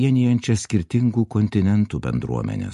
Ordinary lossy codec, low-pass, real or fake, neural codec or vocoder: MP3, 48 kbps; 14.4 kHz; real; none